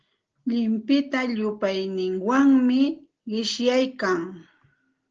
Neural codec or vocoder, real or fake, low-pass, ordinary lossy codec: none; real; 7.2 kHz; Opus, 16 kbps